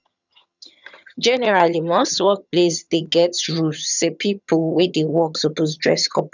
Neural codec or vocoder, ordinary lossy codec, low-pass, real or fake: vocoder, 22.05 kHz, 80 mel bands, HiFi-GAN; none; 7.2 kHz; fake